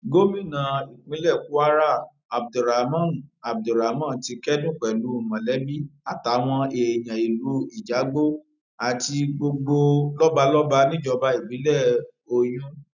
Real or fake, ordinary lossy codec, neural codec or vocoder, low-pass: real; none; none; 7.2 kHz